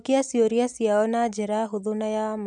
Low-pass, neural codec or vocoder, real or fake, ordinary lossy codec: 10.8 kHz; none; real; MP3, 96 kbps